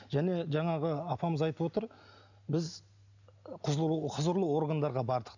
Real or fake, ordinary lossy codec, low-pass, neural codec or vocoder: real; none; 7.2 kHz; none